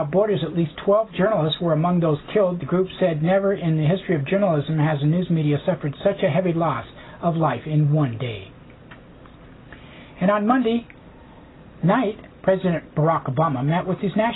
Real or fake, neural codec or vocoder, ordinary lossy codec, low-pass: real; none; AAC, 16 kbps; 7.2 kHz